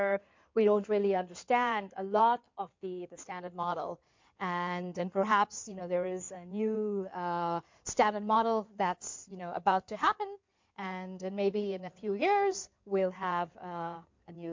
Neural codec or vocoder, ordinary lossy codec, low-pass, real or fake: codec, 16 kHz in and 24 kHz out, 2.2 kbps, FireRedTTS-2 codec; MP3, 48 kbps; 7.2 kHz; fake